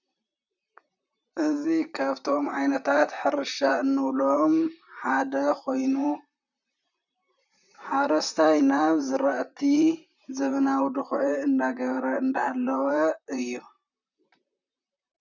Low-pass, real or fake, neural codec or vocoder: 7.2 kHz; fake; vocoder, 44.1 kHz, 128 mel bands, Pupu-Vocoder